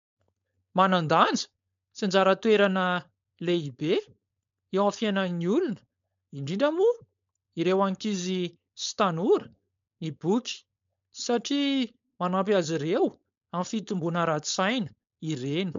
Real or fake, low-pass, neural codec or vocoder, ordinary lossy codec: fake; 7.2 kHz; codec, 16 kHz, 4.8 kbps, FACodec; MP3, 64 kbps